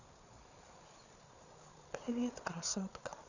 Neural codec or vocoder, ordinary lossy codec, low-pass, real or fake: codec, 16 kHz, 8 kbps, FreqCodec, larger model; none; 7.2 kHz; fake